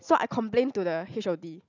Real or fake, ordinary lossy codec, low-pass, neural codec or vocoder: real; none; 7.2 kHz; none